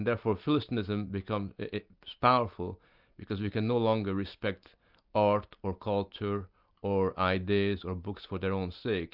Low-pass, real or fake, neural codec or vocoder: 5.4 kHz; real; none